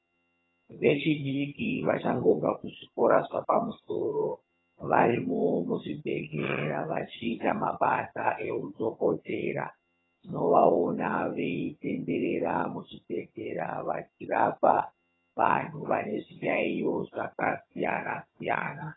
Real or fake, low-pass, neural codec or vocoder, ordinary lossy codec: fake; 7.2 kHz; vocoder, 22.05 kHz, 80 mel bands, HiFi-GAN; AAC, 16 kbps